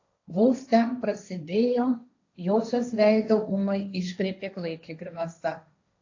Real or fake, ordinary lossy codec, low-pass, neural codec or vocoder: fake; AAC, 48 kbps; 7.2 kHz; codec, 16 kHz, 1.1 kbps, Voila-Tokenizer